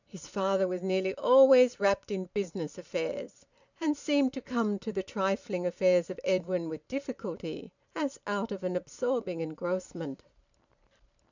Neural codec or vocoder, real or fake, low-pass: vocoder, 44.1 kHz, 128 mel bands every 512 samples, BigVGAN v2; fake; 7.2 kHz